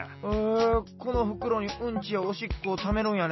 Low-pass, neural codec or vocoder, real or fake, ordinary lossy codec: 7.2 kHz; none; real; MP3, 24 kbps